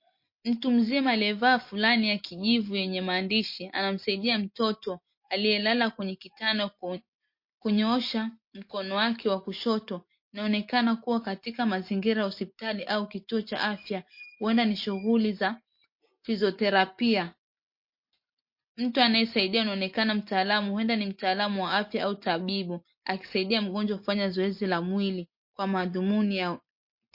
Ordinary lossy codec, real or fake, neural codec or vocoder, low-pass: MP3, 32 kbps; real; none; 5.4 kHz